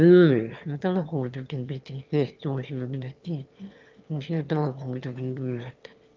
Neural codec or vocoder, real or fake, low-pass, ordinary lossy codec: autoencoder, 22.05 kHz, a latent of 192 numbers a frame, VITS, trained on one speaker; fake; 7.2 kHz; Opus, 24 kbps